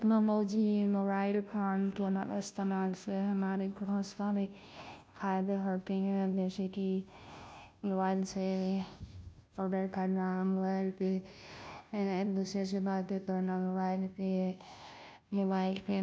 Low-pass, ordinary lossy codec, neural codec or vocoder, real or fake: none; none; codec, 16 kHz, 0.5 kbps, FunCodec, trained on Chinese and English, 25 frames a second; fake